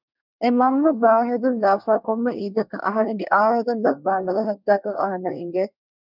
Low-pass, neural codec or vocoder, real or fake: 5.4 kHz; codec, 24 kHz, 1 kbps, SNAC; fake